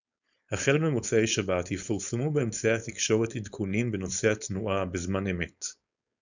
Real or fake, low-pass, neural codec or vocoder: fake; 7.2 kHz; codec, 16 kHz, 4.8 kbps, FACodec